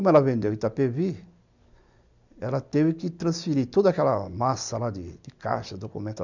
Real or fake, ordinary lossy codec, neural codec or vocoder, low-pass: real; none; none; 7.2 kHz